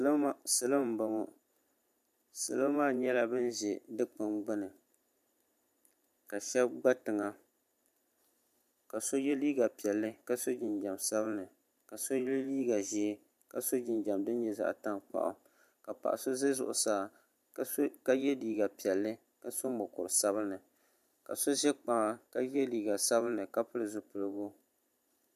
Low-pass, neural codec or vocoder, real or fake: 14.4 kHz; vocoder, 44.1 kHz, 128 mel bands every 512 samples, BigVGAN v2; fake